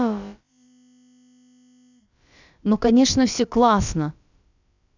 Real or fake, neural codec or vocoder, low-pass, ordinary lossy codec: fake; codec, 16 kHz, about 1 kbps, DyCAST, with the encoder's durations; 7.2 kHz; none